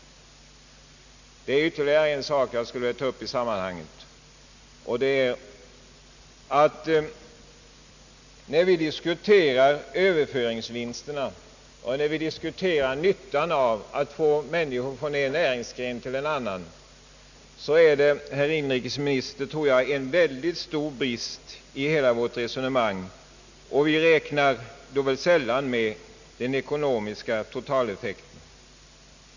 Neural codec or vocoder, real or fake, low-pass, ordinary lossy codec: none; real; 7.2 kHz; none